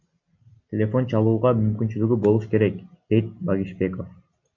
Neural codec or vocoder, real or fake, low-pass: none; real; 7.2 kHz